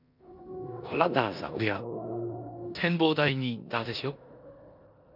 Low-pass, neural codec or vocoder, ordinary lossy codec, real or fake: 5.4 kHz; codec, 16 kHz in and 24 kHz out, 0.9 kbps, LongCat-Audio-Codec, four codebook decoder; none; fake